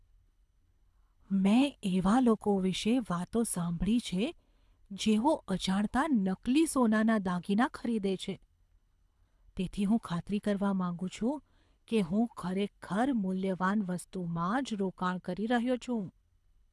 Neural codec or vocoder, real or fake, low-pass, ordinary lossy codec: codec, 24 kHz, 6 kbps, HILCodec; fake; none; none